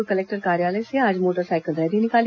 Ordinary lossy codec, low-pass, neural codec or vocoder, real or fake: AAC, 48 kbps; 7.2 kHz; none; real